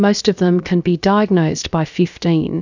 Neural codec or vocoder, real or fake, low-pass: codec, 16 kHz, about 1 kbps, DyCAST, with the encoder's durations; fake; 7.2 kHz